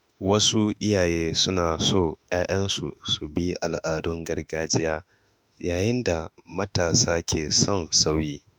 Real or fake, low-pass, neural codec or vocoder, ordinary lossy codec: fake; none; autoencoder, 48 kHz, 32 numbers a frame, DAC-VAE, trained on Japanese speech; none